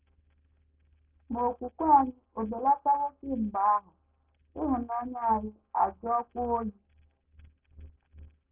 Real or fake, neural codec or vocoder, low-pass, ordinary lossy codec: real; none; 3.6 kHz; Opus, 32 kbps